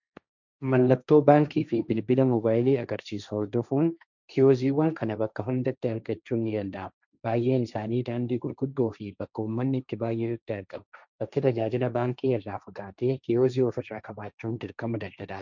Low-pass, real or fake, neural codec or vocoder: 7.2 kHz; fake; codec, 16 kHz, 1.1 kbps, Voila-Tokenizer